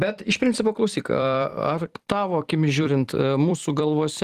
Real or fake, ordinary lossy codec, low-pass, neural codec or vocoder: fake; Opus, 24 kbps; 14.4 kHz; vocoder, 44.1 kHz, 128 mel bands every 256 samples, BigVGAN v2